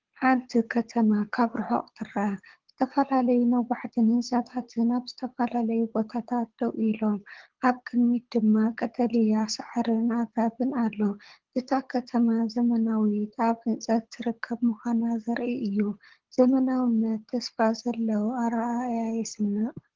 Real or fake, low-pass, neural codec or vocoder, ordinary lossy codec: fake; 7.2 kHz; codec, 24 kHz, 6 kbps, HILCodec; Opus, 16 kbps